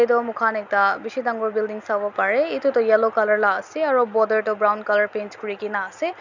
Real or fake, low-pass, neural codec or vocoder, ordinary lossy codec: real; 7.2 kHz; none; none